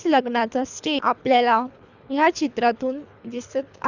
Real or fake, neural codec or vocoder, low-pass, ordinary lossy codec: fake; codec, 24 kHz, 3 kbps, HILCodec; 7.2 kHz; none